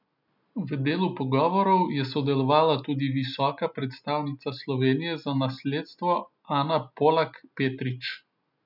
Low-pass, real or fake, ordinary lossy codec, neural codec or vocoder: 5.4 kHz; real; none; none